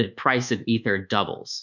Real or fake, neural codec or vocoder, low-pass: fake; codec, 24 kHz, 1.2 kbps, DualCodec; 7.2 kHz